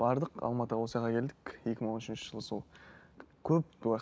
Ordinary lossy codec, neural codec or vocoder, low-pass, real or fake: none; none; none; real